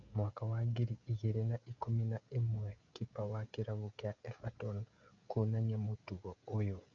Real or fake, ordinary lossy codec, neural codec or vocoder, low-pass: fake; AAC, 64 kbps; codec, 16 kHz, 16 kbps, FreqCodec, smaller model; 7.2 kHz